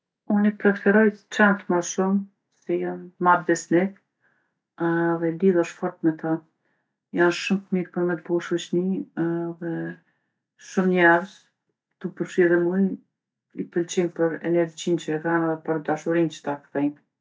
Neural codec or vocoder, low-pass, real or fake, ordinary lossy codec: none; none; real; none